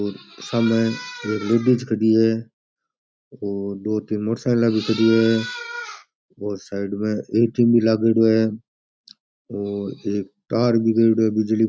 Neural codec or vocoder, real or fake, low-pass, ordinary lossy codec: none; real; none; none